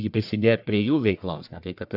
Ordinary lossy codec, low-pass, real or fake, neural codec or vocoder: AAC, 48 kbps; 5.4 kHz; fake; codec, 44.1 kHz, 1.7 kbps, Pupu-Codec